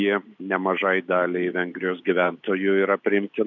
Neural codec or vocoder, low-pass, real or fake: none; 7.2 kHz; real